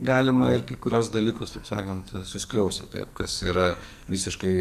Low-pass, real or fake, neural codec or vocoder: 14.4 kHz; fake; codec, 44.1 kHz, 2.6 kbps, SNAC